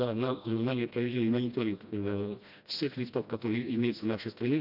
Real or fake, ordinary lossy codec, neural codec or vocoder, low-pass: fake; none; codec, 16 kHz, 1 kbps, FreqCodec, smaller model; 5.4 kHz